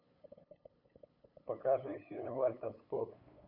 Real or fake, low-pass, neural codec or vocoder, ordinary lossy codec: fake; 5.4 kHz; codec, 16 kHz, 8 kbps, FunCodec, trained on LibriTTS, 25 frames a second; none